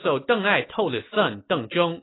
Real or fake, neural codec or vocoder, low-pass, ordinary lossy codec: real; none; 7.2 kHz; AAC, 16 kbps